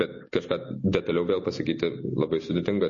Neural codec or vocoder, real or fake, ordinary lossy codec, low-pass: none; real; MP3, 32 kbps; 7.2 kHz